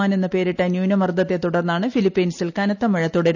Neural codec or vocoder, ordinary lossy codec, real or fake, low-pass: none; none; real; 7.2 kHz